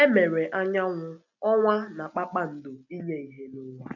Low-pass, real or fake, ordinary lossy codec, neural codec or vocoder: 7.2 kHz; real; none; none